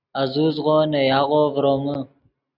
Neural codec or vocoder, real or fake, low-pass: none; real; 5.4 kHz